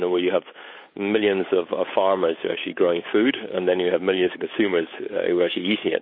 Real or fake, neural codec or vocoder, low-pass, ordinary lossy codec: fake; codec, 16 kHz, 8 kbps, FunCodec, trained on Chinese and English, 25 frames a second; 5.4 kHz; MP3, 24 kbps